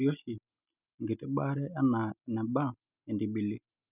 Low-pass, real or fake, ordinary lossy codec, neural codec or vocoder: 3.6 kHz; real; none; none